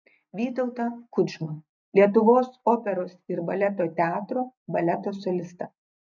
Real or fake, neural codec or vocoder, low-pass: real; none; 7.2 kHz